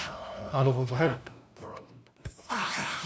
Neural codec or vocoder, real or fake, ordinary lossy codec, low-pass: codec, 16 kHz, 0.5 kbps, FunCodec, trained on LibriTTS, 25 frames a second; fake; none; none